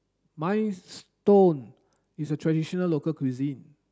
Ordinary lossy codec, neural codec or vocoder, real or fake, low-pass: none; none; real; none